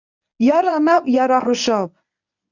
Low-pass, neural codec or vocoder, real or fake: 7.2 kHz; codec, 24 kHz, 0.9 kbps, WavTokenizer, medium speech release version 1; fake